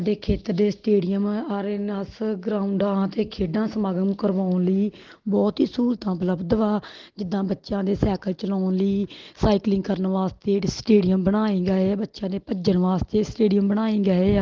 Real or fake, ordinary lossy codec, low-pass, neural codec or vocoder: real; Opus, 16 kbps; 7.2 kHz; none